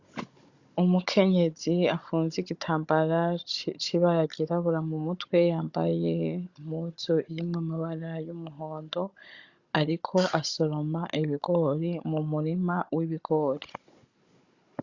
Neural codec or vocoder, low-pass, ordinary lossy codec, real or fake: codec, 16 kHz, 16 kbps, FunCodec, trained on Chinese and English, 50 frames a second; 7.2 kHz; Opus, 64 kbps; fake